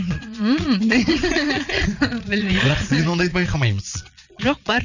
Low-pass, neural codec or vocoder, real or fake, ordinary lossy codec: 7.2 kHz; vocoder, 22.05 kHz, 80 mel bands, Vocos; fake; none